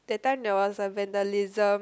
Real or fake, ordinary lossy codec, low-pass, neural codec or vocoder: real; none; none; none